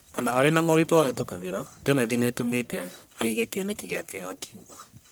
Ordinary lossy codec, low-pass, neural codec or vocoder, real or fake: none; none; codec, 44.1 kHz, 1.7 kbps, Pupu-Codec; fake